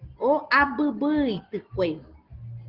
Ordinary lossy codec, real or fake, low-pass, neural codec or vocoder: Opus, 16 kbps; real; 5.4 kHz; none